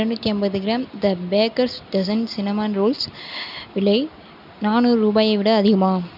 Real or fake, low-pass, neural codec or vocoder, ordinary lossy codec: real; 5.4 kHz; none; none